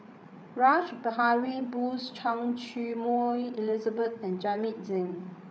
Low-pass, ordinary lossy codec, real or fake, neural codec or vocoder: none; none; fake; codec, 16 kHz, 8 kbps, FreqCodec, larger model